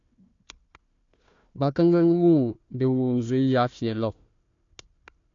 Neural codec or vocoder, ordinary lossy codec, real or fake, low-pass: codec, 16 kHz, 1 kbps, FunCodec, trained on Chinese and English, 50 frames a second; AAC, 48 kbps; fake; 7.2 kHz